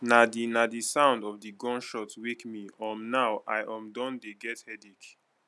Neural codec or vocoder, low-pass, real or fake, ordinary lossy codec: none; none; real; none